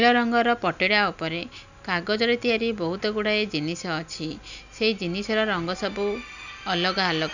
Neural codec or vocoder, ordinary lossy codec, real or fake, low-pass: none; none; real; 7.2 kHz